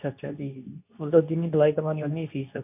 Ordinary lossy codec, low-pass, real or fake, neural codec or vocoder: none; 3.6 kHz; fake; codec, 24 kHz, 0.9 kbps, WavTokenizer, medium speech release version 2